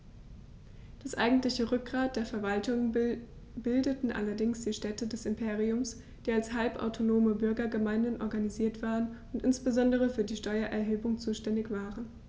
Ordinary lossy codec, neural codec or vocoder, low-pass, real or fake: none; none; none; real